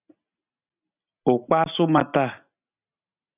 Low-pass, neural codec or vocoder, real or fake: 3.6 kHz; none; real